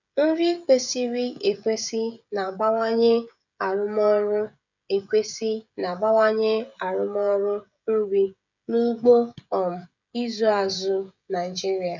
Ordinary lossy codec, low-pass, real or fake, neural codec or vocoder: none; 7.2 kHz; fake; codec, 16 kHz, 16 kbps, FreqCodec, smaller model